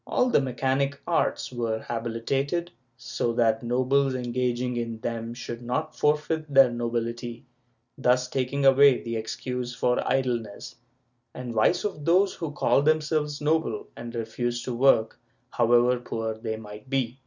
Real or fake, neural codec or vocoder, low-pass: real; none; 7.2 kHz